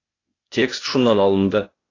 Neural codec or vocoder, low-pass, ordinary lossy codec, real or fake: codec, 16 kHz, 0.8 kbps, ZipCodec; 7.2 kHz; AAC, 32 kbps; fake